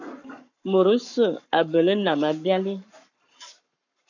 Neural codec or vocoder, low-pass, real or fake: codec, 44.1 kHz, 7.8 kbps, Pupu-Codec; 7.2 kHz; fake